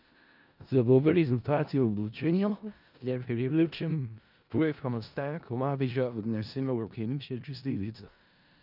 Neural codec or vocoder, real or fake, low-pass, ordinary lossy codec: codec, 16 kHz in and 24 kHz out, 0.4 kbps, LongCat-Audio-Codec, four codebook decoder; fake; 5.4 kHz; none